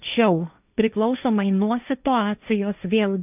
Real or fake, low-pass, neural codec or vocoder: fake; 3.6 kHz; codec, 16 kHz, 1.1 kbps, Voila-Tokenizer